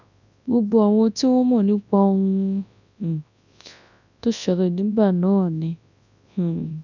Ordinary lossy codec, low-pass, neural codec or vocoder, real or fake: none; 7.2 kHz; codec, 24 kHz, 0.9 kbps, WavTokenizer, large speech release; fake